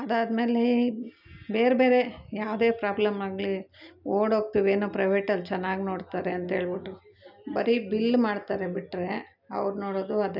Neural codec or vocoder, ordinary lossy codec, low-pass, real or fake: none; none; 5.4 kHz; real